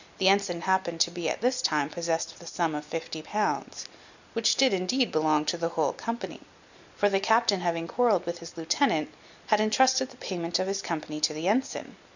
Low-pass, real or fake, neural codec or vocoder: 7.2 kHz; real; none